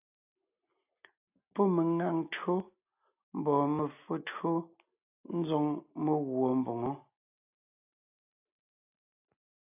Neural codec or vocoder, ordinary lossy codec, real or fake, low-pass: none; AAC, 32 kbps; real; 3.6 kHz